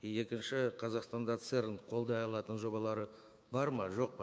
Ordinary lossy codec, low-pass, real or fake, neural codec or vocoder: none; none; fake; codec, 16 kHz, 6 kbps, DAC